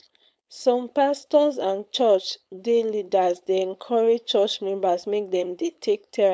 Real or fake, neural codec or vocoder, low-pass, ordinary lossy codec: fake; codec, 16 kHz, 4.8 kbps, FACodec; none; none